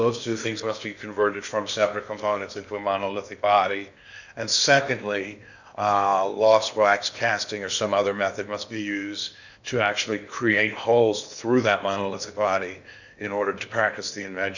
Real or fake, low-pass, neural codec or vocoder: fake; 7.2 kHz; codec, 16 kHz in and 24 kHz out, 0.8 kbps, FocalCodec, streaming, 65536 codes